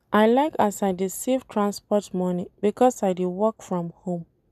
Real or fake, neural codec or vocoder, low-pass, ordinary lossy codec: real; none; 14.4 kHz; none